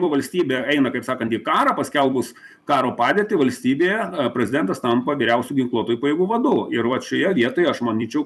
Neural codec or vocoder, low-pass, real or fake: vocoder, 44.1 kHz, 128 mel bands every 256 samples, BigVGAN v2; 14.4 kHz; fake